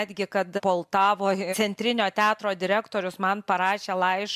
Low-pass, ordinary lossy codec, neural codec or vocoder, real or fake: 14.4 kHz; MP3, 96 kbps; none; real